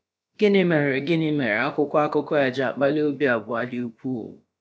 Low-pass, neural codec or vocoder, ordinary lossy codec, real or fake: none; codec, 16 kHz, about 1 kbps, DyCAST, with the encoder's durations; none; fake